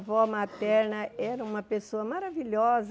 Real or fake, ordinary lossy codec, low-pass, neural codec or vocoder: real; none; none; none